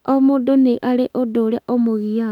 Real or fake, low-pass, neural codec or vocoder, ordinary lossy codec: fake; 19.8 kHz; autoencoder, 48 kHz, 32 numbers a frame, DAC-VAE, trained on Japanese speech; none